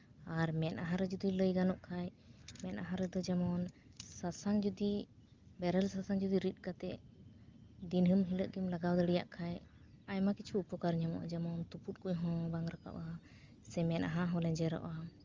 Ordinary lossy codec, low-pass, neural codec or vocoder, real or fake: Opus, 32 kbps; 7.2 kHz; none; real